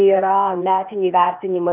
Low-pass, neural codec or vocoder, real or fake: 3.6 kHz; codec, 16 kHz, 0.8 kbps, ZipCodec; fake